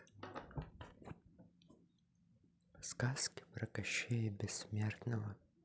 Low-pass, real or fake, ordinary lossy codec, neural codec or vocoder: none; real; none; none